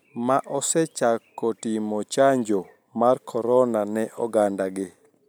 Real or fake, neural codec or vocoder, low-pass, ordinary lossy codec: real; none; none; none